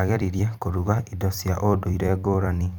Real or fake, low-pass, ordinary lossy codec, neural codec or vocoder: fake; none; none; vocoder, 44.1 kHz, 128 mel bands every 256 samples, BigVGAN v2